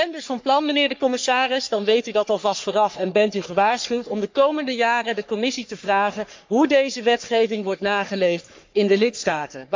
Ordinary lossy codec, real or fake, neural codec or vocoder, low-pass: MP3, 64 kbps; fake; codec, 44.1 kHz, 3.4 kbps, Pupu-Codec; 7.2 kHz